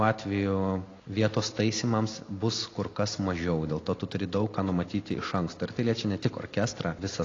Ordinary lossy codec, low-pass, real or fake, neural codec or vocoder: AAC, 32 kbps; 7.2 kHz; real; none